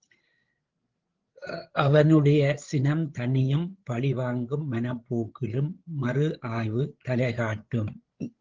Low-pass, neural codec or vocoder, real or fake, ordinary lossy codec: 7.2 kHz; codec, 16 kHz, 8 kbps, FreqCodec, larger model; fake; Opus, 16 kbps